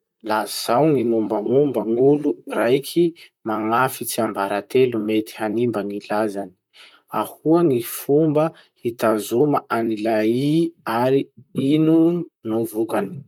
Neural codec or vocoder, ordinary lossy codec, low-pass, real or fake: vocoder, 44.1 kHz, 128 mel bands, Pupu-Vocoder; none; 19.8 kHz; fake